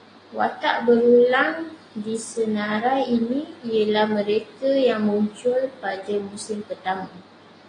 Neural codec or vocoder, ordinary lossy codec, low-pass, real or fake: none; AAC, 32 kbps; 9.9 kHz; real